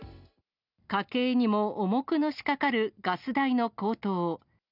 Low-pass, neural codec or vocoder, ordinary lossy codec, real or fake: 5.4 kHz; none; none; real